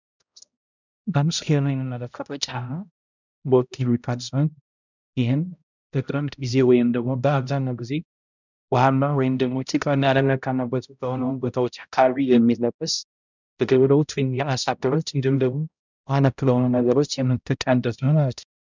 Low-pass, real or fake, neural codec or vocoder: 7.2 kHz; fake; codec, 16 kHz, 0.5 kbps, X-Codec, HuBERT features, trained on balanced general audio